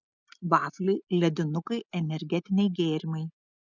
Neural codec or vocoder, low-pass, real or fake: none; 7.2 kHz; real